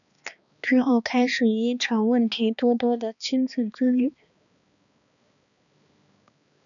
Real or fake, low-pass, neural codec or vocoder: fake; 7.2 kHz; codec, 16 kHz, 2 kbps, X-Codec, HuBERT features, trained on balanced general audio